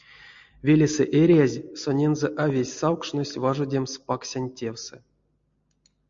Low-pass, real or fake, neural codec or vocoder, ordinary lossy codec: 7.2 kHz; real; none; MP3, 48 kbps